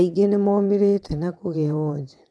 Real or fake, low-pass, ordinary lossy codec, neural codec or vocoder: fake; none; none; vocoder, 22.05 kHz, 80 mel bands, WaveNeXt